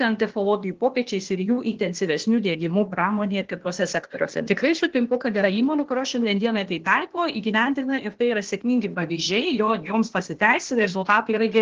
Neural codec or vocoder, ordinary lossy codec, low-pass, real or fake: codec, 16 kHz, 0.8 kbps, ZipCodec; Opus, 16 kbps; 7.2 kHz; fake